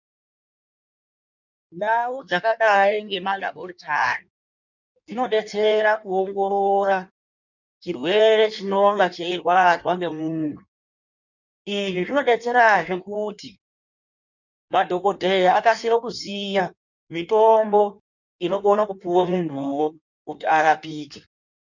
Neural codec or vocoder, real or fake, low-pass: codec, 16 kHz in and 24 kHz out, 1.1 kbps, FireRedTTS-2 codec; fake; 7.2 kHz